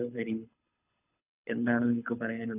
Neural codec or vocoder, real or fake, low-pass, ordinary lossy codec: codec, 24 kHz, 6 kbps, HILCodec; fake; 3.6 kHz; none